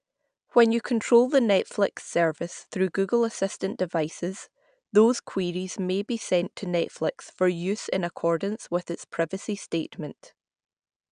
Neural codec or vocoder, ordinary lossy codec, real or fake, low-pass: none; none; real; 9.9 kHz